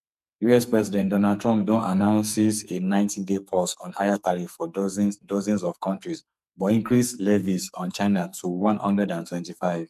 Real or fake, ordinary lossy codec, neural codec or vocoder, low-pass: fake; none; codec, 44.1 kHz, 2.6 kbps, SNAC; 14.4 kHz